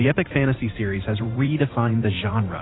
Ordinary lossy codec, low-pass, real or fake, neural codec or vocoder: AAC, 16 kbps; 7.2 kHz; fake; vocoder, 44.1 kHz, 128 mel bands every 512 samples, BigVGAN v2